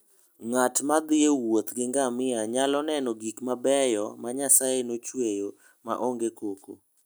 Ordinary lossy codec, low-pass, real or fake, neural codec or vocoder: none; none; real; none